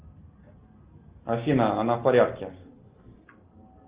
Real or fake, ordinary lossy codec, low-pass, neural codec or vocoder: real; Opus, 32 kbps; 3.6 kHz; none